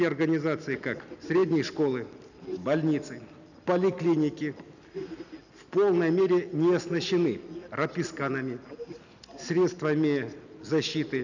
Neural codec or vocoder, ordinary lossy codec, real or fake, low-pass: none; none; real; 7.2 kHz